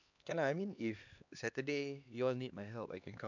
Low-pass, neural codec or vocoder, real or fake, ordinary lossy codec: 7.2 kHz; codec, 16 kHz, 2 kbps, X-Codec, HuBERT features, trained on LibriSpeech; fake; none